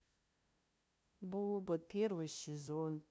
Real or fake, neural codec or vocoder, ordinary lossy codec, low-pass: fake; codec, 16 kHz, 0.5 kbps, FunCodec, trained on LibriTTS, 25 frames a second; none; none